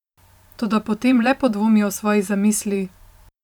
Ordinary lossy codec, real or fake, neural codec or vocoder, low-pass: none; fake; vocoder, 44.1 kHz, 128 mel bands every 512 samples, BigVGAN v2; 19.8 kHz